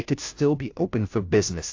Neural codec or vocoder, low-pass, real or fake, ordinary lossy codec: codec, 16 kHz, 0.5 kbps, FunCodec, trained on Chinese and English, 25 frames a second; 7.2 kHz; fake; AAC, 48 kbps